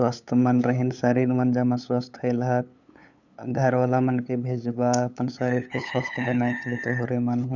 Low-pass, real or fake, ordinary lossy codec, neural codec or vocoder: 7.2 kHz; fake; none; codec, 16 kHz, 8 kbps, FunCodec, trained on LibriTTS, 25 frames a second